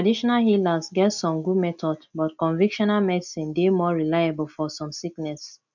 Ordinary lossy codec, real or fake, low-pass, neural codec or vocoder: none; real; 7.2 kHz; none